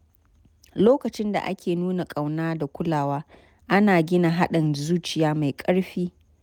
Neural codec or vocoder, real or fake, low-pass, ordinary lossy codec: none; real; 19.8 kHz; none